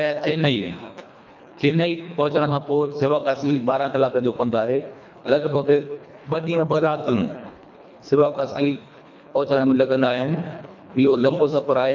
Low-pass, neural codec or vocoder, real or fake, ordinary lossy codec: 7.2 kHz; codec, 24 kHz, 1.5 kbps, HILCodec; fake; none